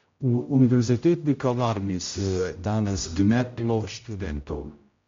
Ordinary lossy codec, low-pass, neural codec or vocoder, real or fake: MP3, 48 kbps; 7.2 kHz; codec, 16 kHz, 0.5 kbps, X-Codec, HuBERT features, trained on general audio; fake